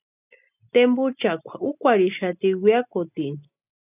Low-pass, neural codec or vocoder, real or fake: 3.6 kHz; none; real